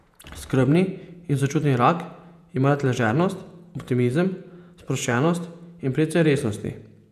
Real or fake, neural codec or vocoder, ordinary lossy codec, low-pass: fake; vocoder, 48 kHz, 128 mel bands, Vocos; none; 14.4 kHz